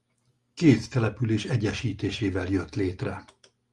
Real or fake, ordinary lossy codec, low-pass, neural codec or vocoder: real; Opus, 32 kbps; 10.8 kHz; none